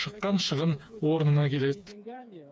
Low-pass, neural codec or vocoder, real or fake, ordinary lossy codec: none; codec, 16 kHz, 4 kbps, FreqCodec, smaller model; fake; none